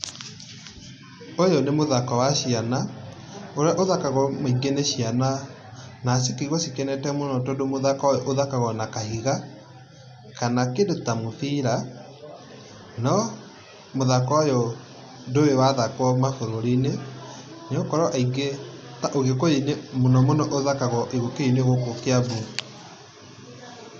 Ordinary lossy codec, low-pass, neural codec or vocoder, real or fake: none; none; none; real